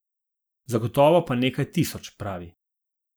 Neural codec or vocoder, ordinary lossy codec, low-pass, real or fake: none; none; none; real